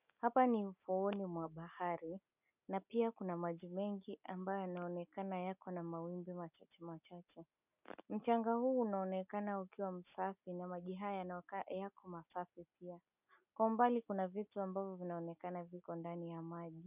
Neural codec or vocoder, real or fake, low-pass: none; real; 3.6 kHz